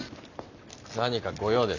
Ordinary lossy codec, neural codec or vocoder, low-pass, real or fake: none; none; 7.2 kHz; real